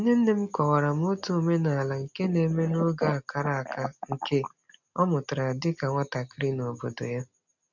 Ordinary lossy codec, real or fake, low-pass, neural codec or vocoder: none; real; 7.2 kHz; none